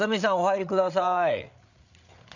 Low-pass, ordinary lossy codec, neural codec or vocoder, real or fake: 7.2 kHz; none; codec, 16 kHz, 8 kbps, FreqCodec, larger model; fake